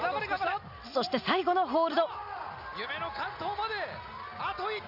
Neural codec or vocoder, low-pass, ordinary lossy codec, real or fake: none; 5.4 kHz; none; real